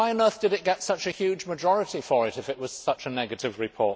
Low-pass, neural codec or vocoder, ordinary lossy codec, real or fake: none; none; none; real